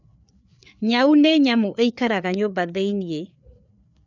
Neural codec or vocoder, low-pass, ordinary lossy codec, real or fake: codec, 16 kHz, 4 kbps, FreqCodec, larger model; 7.2 kHz; none; fake